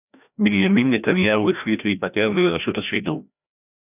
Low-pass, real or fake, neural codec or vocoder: 3.6 kHz; fake; codec, 16 kHz, 1 kbps, FreqCodec, larger model